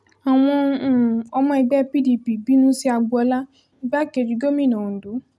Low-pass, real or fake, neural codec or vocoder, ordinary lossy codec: none; real; none; none